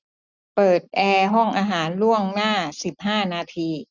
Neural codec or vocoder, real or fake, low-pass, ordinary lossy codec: none; real; 7.2 kHz; none